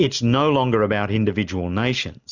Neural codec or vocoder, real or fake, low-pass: none; real; 7.2 kHz